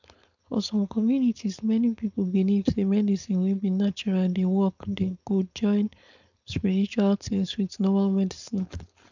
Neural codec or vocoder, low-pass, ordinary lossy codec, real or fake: codec, 16 kHz, 4.8 kbps, FACodec; 7.2 kHz; none; fake